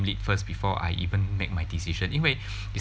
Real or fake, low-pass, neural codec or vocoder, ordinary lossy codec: real; none; none; none